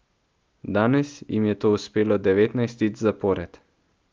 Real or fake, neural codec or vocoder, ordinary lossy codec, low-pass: real; none; Opus, 32 kbps; 7.2 kHz